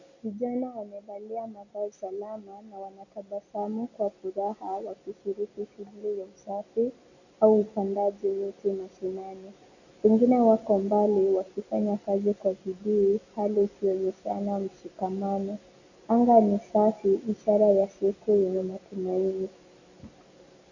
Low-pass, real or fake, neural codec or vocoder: 7.2 kHz; real; none